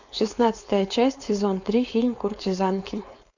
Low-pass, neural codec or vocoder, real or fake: 7.2 kHz; codec, 16 kHz, 4.8 kbps, FACodec; fake